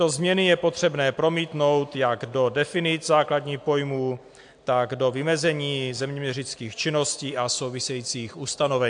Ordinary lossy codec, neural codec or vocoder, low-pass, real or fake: AAC, 64 kbps; none; 9.9 kHz; real